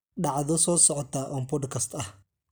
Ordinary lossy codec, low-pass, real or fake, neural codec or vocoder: none; none; real; none